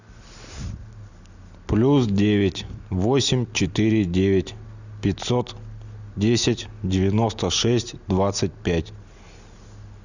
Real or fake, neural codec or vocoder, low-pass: real; none; 7.2 kHz